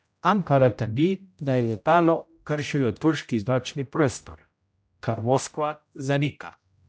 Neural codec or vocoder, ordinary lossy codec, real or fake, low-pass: codec, 16 kHz, 0.5 kbps, X-Codec, HuBERT features, trained on general audio; none; fake; none